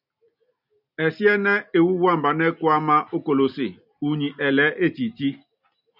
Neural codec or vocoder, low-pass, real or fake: none; 5.4 kHz; real